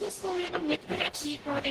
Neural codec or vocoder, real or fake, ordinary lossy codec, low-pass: codec, 44.1 kHz, 0.9 kbps, DAC; fake; Opus, 16 kbps; 14.4 kHz